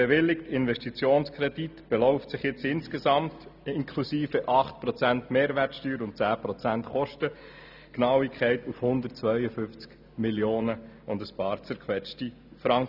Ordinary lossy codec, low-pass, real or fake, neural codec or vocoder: none; 5.4 kHz; real; none